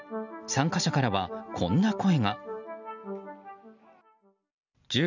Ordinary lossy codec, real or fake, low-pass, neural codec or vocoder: none; real; 7.2 kHz; none